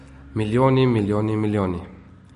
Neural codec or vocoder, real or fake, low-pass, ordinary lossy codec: none; real; 14.4 kHz; MP3, 48 kbps